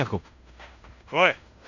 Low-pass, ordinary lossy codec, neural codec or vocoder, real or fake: 7.2 kHz; none; codec, 16 kHz in and 24 kHz out, 0.9 kbps, LongCat-Audio-Codec, fine tuned four codebook decoder; fake